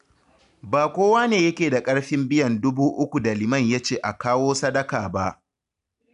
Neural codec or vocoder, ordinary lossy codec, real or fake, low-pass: none; none; real; 10.8 kHz